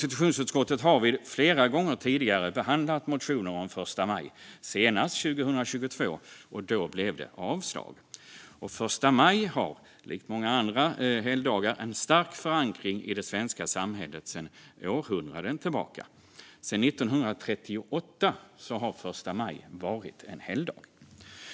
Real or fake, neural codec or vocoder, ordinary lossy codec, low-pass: real; none; none; none